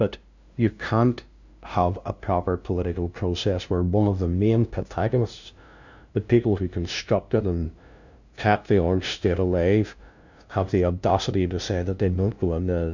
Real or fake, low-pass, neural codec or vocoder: fake; 7.2 kHz; codec, 16 kHz, 0.5 kbps, FunCodec, trained on LibriTTS, 25 frames a second